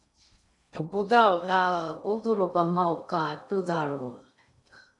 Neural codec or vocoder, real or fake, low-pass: codec, 16 kHz in and 24 kHz out, 0.6 kbps, FocalCodec, streaming, 2048 codes; fake; 10.8 kHz